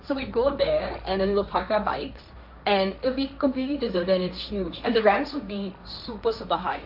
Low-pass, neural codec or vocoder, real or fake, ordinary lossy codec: 5.4 kHz; codec, 16 kHz, 1.1 kbps, Voila-Tokenizer; fake; none